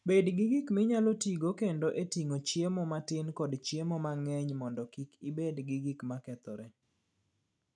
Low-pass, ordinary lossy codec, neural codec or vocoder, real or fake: none; none; none; real